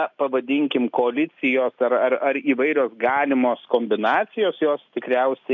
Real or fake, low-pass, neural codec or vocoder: real; 7.2 kHz; none